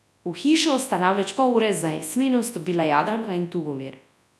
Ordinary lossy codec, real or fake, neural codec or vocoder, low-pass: none; fake; codec, 24 kHz, 0.9 kbps, WavTokenizer, large speech release; none